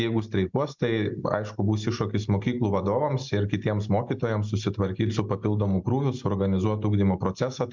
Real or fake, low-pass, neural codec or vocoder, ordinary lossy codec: real; 7.2 kHz; none; MP3, 64 kbps